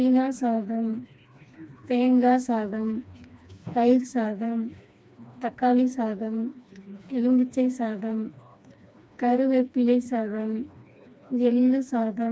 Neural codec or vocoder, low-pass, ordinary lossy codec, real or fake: codec, 16 kHz, 2 kbps, FreqCodec, smaller model; none; none; fake